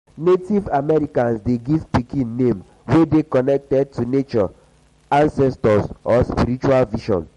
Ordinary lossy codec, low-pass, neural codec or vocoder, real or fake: MP3, 48 kbps; 19.8 kHz; none; real